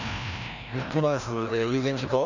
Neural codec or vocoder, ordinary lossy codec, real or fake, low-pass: codec, 16 kHz, 1 kbps, FreqCodec, larger model; none; fake; 7.2 kHz